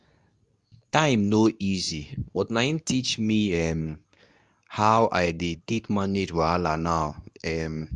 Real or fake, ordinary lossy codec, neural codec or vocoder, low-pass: fake; none; codec, 24 kHz, 0.9 kbps, WavTokenizer, medium speech release version 2; 10.8 kHz